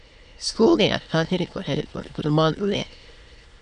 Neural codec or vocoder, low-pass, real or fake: autoencoder, 22.05 kHz, a latent of 192 numbers a frame, VITS, trained on many speakers; 9.9 kHz; fake